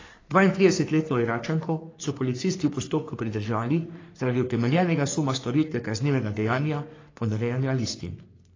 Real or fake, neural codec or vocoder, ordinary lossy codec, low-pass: fake; codec, 44.1 kHz, 3.4 kbps, Pupu-Codec; AAC, 32 kbps; 7.2 kHz